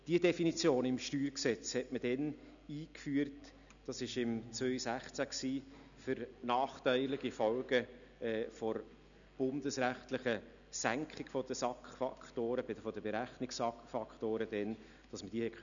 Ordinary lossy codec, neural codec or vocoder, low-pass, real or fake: none; none; 7.2 kHz; real